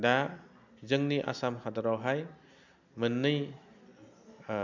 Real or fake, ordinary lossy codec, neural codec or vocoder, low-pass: real; MP3, 64 kbps; none; 7.2 kHz